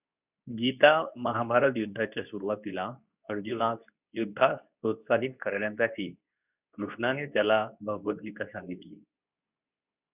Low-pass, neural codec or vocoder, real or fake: 3.6 kHz; codec, 24 kHz, 0.9 kbps, WavTokenizer, medium speech release version 2; fake